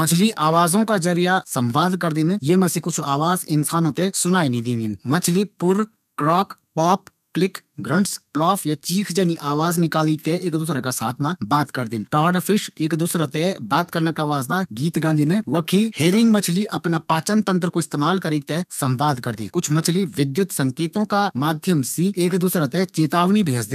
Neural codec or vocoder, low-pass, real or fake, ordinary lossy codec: codec, 32 kHz, 1.9 kbps, SNAC; 14.4 kHz; fake; none